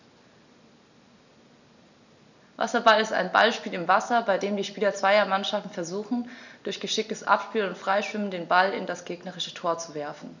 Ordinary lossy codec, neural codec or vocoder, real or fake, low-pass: none; none; real; 7.2 kHz